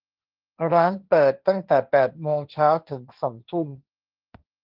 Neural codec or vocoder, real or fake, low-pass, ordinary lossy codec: codec, 16 kHz, 1.1 kbps, Voila-Tokenizer; fake; 5.4 kHz; Opus, 32 kbps